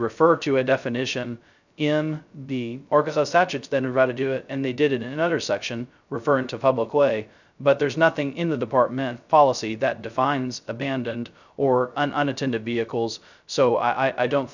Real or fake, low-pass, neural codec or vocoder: fake; 7.2 kHz; codec, 16 kHz, 0.2 kbps, FocalCodec